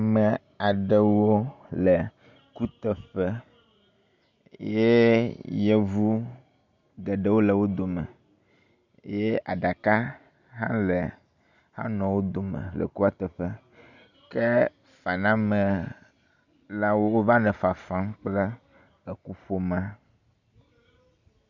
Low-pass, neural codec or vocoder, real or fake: 7.2 kHz; none; real